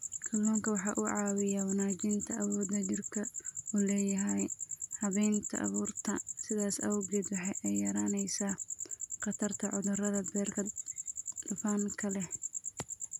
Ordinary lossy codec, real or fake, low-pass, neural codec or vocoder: none; real; 19.8 kHz; none